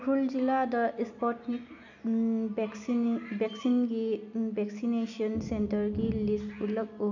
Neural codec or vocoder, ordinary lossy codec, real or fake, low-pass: none; none; real; 7.2 kHz